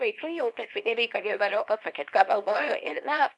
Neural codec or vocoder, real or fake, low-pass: codec, 24 kHz, 0.9 kbps, WavTokenizer, small release; fake; 10.8 kHz